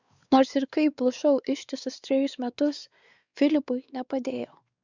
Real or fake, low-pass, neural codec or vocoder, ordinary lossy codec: fake; 7.2 kHz; codec, 16 kHz, 4 kbps, X-Codec, WavLM features, trained on Multilingual LibriSpeech; Opus, 64 kbps